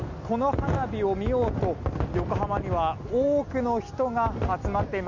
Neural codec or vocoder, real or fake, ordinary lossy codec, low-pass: none; real; none; 7.2 kHz